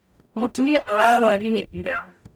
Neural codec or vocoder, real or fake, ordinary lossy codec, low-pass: codec, 44.1 kHz, 0.9 kbps, DAC; fake; none; none